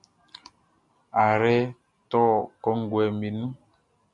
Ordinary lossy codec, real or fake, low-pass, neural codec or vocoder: MP3, 64 kbps; real; 10.8 kHz; none